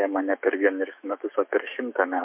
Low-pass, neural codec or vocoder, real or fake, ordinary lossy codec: 3.6 kHz; autoencoder, 48 kHz, 128 numbers a frame, DAC-VAE, trained on Japanese speech; fake; MP3, 32 kbps